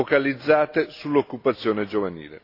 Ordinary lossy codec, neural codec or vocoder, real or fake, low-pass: AAC, 32 kbps; none; real; 5.4 kHz